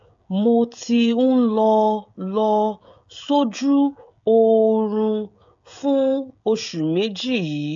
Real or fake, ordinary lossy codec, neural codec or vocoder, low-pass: fake; AAC, 64 kbps; codec, 16 kHz, 16 kbps, FreqCodec, smaller model; 7.2 kHz